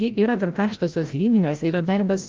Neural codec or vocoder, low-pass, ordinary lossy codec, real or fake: codec, 16 kHz, 0.5 kbps, FreqCodec, larger model; 7.2 kHz; Opus, 32 kbps; fake